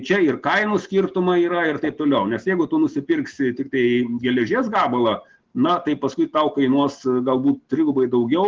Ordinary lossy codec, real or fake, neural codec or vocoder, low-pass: Opus, 16 kbps; real; none; 7.2 kHz